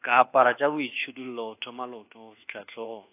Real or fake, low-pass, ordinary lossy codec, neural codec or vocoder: fake; 3.6 kHz; AAC, 24 kbps; codec, 24 kHz, 1.2 kbps, DualCodec